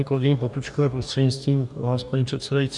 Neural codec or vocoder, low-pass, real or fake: codec, 44.1 kHz, 2.6 kbps, DAC; 10.8 kHz; fake